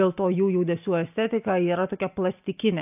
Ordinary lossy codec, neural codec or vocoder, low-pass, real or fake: AAC, 32 kbps; none; 3.6 kHz; real